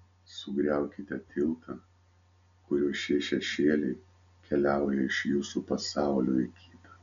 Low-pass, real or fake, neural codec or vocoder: 7.2 kHz; real; none